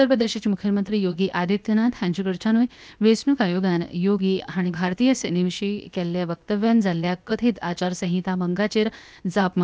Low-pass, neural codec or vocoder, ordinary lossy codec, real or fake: none; codec, 16 kHz, 0.7 kbps, FocalCodec; none; fake